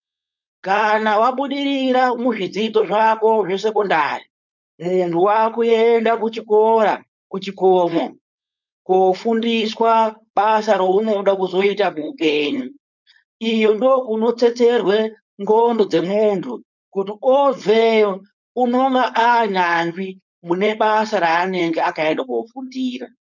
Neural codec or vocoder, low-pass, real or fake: codec, 16 kHz, 4.8 kbps, FACodec; 7.2 kHz; fake